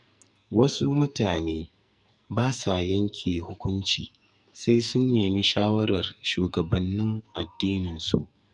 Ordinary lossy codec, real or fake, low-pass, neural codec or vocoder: none; fake; 10.8 kHz; codec, 44.1 kHz, 2.6 kbps, SNAC